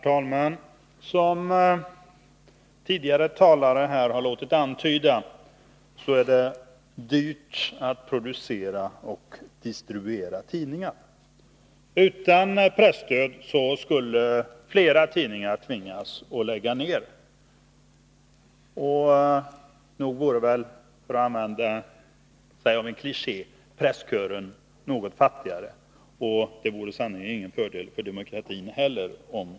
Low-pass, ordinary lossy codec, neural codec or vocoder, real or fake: none; none; none; real